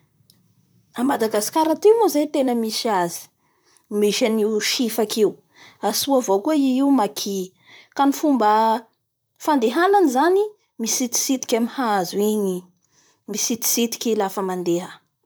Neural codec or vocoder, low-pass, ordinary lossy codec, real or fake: vocoder, 44.1 kHz, 128 mel bands, Pupu-Vocoder; none; none; fake